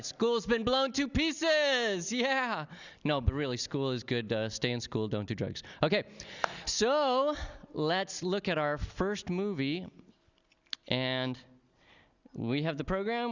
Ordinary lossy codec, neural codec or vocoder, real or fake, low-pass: Opus, 64 kbps; none; real; 7.2 kHz